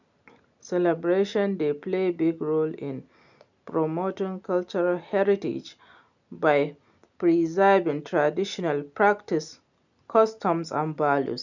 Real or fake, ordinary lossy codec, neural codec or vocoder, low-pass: real; none; none; 7.2 kHz